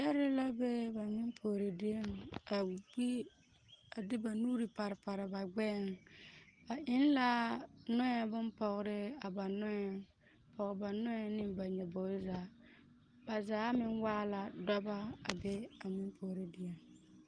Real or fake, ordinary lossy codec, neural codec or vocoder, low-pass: real; Opus, 24 kbps; none; 9.9 kHz